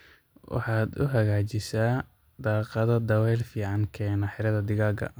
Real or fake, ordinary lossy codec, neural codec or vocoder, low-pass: real; none; none; none